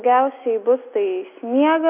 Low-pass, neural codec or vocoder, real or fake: 3.6 kHz; none; real